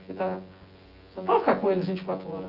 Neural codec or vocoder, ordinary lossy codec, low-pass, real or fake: vocoder, 24 kHz, 100 mel bands, Vocos; Opus, 24 kbps; 5.4 kHz; fake